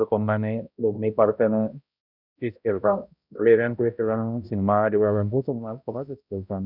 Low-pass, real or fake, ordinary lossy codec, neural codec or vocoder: 5.4 kHz; fake; none; codec, 16 kHz, 0.5 kbps, X-Codec, HuBERT features, trained on balanced general audio